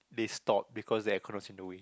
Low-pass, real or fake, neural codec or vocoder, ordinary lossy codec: none; real; none; none